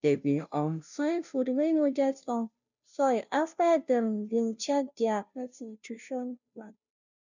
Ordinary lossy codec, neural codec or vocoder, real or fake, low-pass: none; codec, 16 kHz, 0.5 kbps, FunCodec, trained on Chinese and English, 25 frames a second; fake; 7.2 kHz